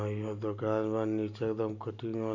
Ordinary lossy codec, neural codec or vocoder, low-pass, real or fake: none; none; 7.2 kHz; real